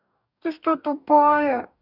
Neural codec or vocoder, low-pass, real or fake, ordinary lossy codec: codec, 44.1 kHz, 2.6 kbps, DAC; 5.4 kHz; fake; none